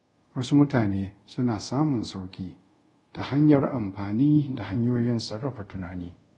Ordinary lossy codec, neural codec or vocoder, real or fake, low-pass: AAC, 32 kbps; codec, 24 kHz, 0.5 kbps, DualCodec; fake; 10.8 kHz